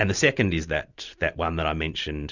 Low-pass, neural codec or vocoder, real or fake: 7.2 kHz; none; real